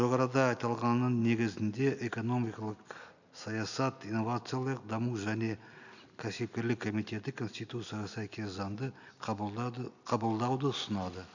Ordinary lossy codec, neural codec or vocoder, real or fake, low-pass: none; none; real; 7.2 kHz